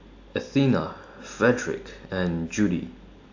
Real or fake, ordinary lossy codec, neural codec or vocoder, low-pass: real; AAC, 48 kbps; none; 7.2 kHz